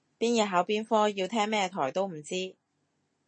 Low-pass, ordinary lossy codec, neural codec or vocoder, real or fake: 9.9 kHz; MP3, 32 kbps; none; real